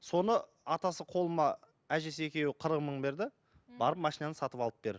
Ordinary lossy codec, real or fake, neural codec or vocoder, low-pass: none; real; none; none